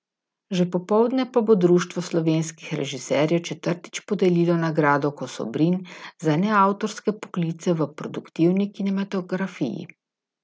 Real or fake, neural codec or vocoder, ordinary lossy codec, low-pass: real; none; none; none